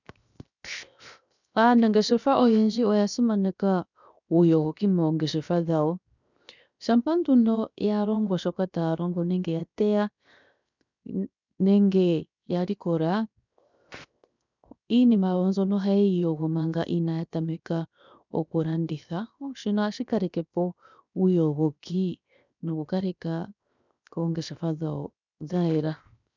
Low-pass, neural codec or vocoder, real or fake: 7.2 kHz; codec, 16 kHz, 0.7 kbps, FocalCodec; fake